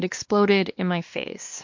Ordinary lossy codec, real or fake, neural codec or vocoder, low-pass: MP3, 48 kbps; fake; codec, 16 kHz, 2 kbps, X-Codec, WavLM features, trained on Multilingual LibriSpeech; 7.2 kHz